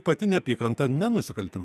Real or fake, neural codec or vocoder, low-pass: fake; codec, 44.1 kHz, 2.6 kbps, SNAC; 14.4 kHz